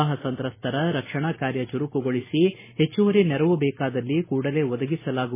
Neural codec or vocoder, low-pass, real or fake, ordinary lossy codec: none; 3.6 kHz; real; MP3, 16 kbps